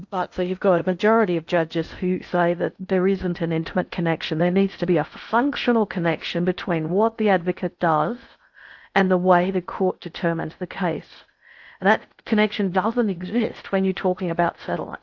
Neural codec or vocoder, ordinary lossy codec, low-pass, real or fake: codec, 16 kHz in and 24 kHz out, 0.6 kbps, FocalCodec, streaming, 2048 codes; AAC, 48 kbps; 7.2 kHz; fake